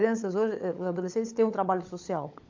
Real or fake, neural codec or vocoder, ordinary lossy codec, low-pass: fake; codec, 16 kHz, 16 kbps, FunCodec, trained on LibriTTS, 50 frames a second; AAC, 48 kbps; 7.2 kHz